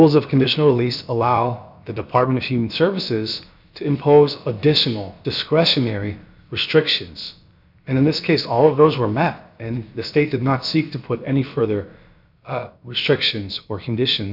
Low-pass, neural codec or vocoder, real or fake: 5.4 kHz; codec, 16 kHz, about 1 kbps, DyCAST, with the encoder's durations; fake